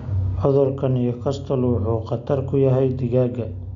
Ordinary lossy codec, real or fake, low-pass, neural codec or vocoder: none; real; 7.2 kHz; none